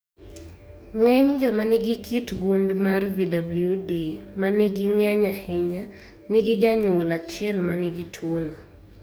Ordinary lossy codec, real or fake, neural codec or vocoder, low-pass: none; fake; codec, 44.1 kHz, 2.6 kbps, DAC; none